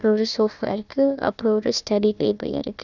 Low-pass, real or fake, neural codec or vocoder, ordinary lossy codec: 7.2 kHz; fake; codec, 16 kHz, 1 kbps, FunCodec, trained on Chinese and English, 50 frames a second; none